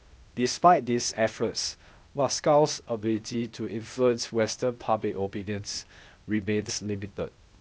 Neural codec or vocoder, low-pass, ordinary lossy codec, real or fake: codec, 16 kHz, 0.8 kbps, ZipCodec; none; none; fake